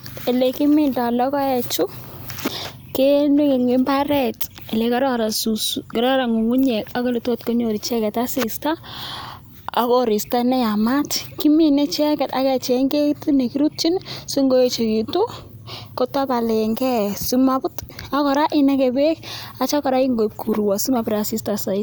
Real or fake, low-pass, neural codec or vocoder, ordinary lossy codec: real; none; none; none